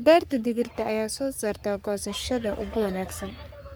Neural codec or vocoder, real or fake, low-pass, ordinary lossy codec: codec, 44.1 kHz, 3.4 kbps, Pupu-Codec; fake; none; none